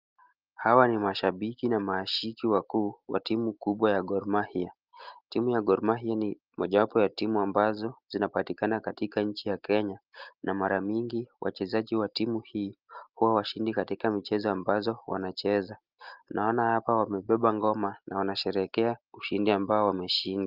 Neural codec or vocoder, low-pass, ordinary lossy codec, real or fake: none; 5.4 kHz; Opus, 32 kbps; real